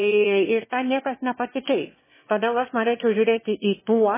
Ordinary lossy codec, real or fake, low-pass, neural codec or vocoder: MP3, 16 kbps; fake; 3.6 kHz; autoencoder, 22.05 kHz, a latent of 192 numbers a frame, VITS, trained on one speaker